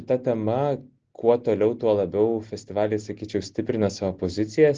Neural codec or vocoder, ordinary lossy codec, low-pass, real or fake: none; Opus, 16 kbps; 7.2 kHz; real